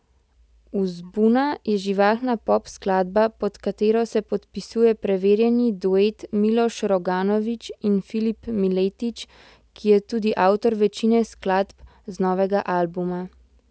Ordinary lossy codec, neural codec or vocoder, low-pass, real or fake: none; none; none; real